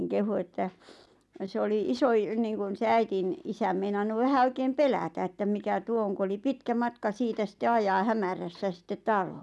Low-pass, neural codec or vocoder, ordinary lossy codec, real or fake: none; none; none; real